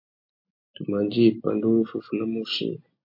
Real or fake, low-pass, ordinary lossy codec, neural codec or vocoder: real; 5.4 kHz; MP3, 32 kbps; none